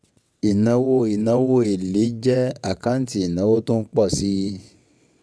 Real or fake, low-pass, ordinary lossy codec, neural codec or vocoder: fake; none; none; vocoder, 22.05 kHz, 80 mel bands, WaveNeXt